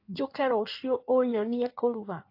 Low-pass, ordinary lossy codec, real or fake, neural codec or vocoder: 5.4 kHz; AAC, 32 kbps; fake; codec, 16 kHz in and 24 kHz out, 1.1 kbps, FireRedTTS-2 codec